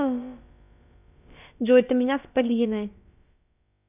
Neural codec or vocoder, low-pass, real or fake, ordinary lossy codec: codec, 16 kHz, about 1 kbps, DyCAST, with the encoder's durations; 3.6 kHz; fake; none